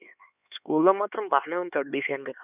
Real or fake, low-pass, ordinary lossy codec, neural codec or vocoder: fake; 3.6 kHz; none; codec, 16 kHz, 4 kbps, X-Codec, HuBERT features, trained on LibriSpeech